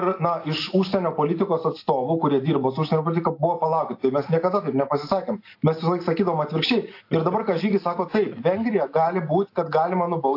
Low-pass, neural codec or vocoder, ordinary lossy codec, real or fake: 5.4 kHz; none; AAC, 32 kbps; real